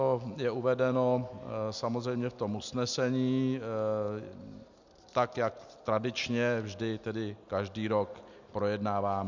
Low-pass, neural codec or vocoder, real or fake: 7.2 kHz; none; real